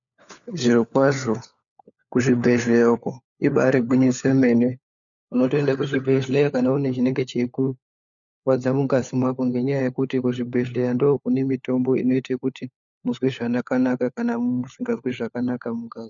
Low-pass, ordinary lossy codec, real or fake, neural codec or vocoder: 7.2 kHz; MP3, 96 kbps; fake; codec, 16 kHz, 4 kbps, FunCodec, trained on LibriTTS, 50 frames a second